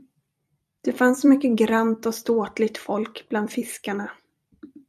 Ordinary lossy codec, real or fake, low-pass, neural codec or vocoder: MP3, 96 kbps; fake; 14.4 kHz; vocoder, 44.1 kHz, 128 mel bands every 256 samples, BigVGAN v2